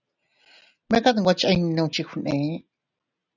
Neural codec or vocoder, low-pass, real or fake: none; 7.2 kHz; real